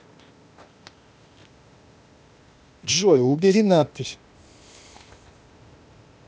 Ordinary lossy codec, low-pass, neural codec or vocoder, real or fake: none; none; codec, 16 kHz, 0.8 kbps, ZipCodec; fake